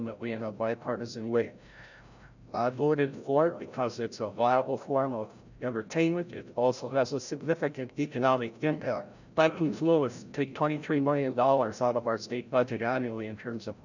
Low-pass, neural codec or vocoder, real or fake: 7.2 kHz; codec, 16 kHz, 0.5 kbps, FreqCodec, larger model; fake